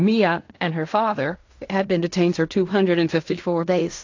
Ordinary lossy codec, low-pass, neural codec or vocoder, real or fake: AAC, 48 kbps; 7.2 kHz; codec, 16 kHz in and 24 kHz out, 0.4 kbps, LongCat-Audio-Codec, fine tuned four codebook decoder; fake